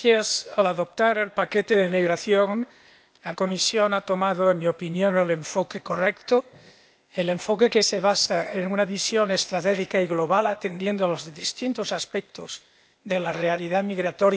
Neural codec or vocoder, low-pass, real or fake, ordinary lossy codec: codec, 16 kHz, 0.8 kbps, ZipCodec; none; fake; none